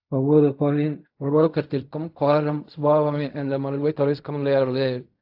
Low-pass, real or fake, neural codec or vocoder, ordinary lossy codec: 5.4 kHz; fake; codec, 16 kHz in and 24 kHz out, 0.4 kbps, LongCat-Audio-Codec, fine tuned four codebook decoder; AAC, 48 kbps